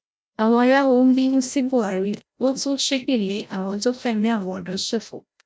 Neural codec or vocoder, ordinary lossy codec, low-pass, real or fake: codec, 16 kHz, 0.5 kbps, FreqCodec, larger model; none; none; fake